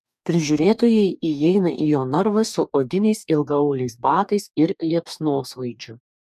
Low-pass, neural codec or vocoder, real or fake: 14.4 kHz; codec, 44.1 kHz, 2.6 kbps, DAC; fake